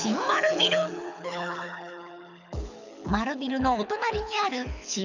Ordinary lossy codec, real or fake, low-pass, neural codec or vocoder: none; fake; 7.2 kHz; codec, 24 kHz, 6 kbps, HILCodec